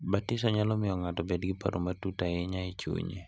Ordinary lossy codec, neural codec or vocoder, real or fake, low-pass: none; none; real; none